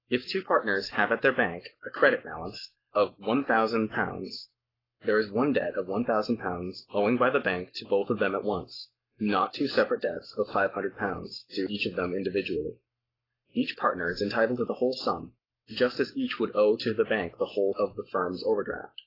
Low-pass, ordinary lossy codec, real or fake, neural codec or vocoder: 5.4 kHz; AAC, 24 kbps; fake; codec, 44.1 kHz, 7.8 kbps, Pupu-Codec